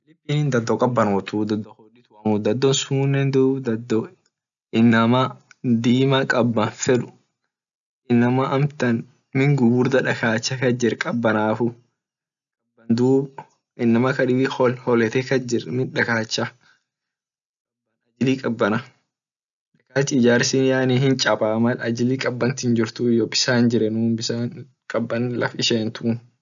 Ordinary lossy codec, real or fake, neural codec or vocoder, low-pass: none; real; none; 7.2 kHz